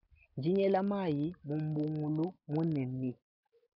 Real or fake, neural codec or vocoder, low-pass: real; none; 5.4 kHz